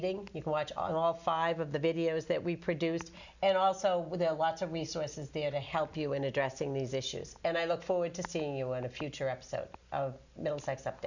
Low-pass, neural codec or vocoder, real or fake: 7.2 kHz; none; real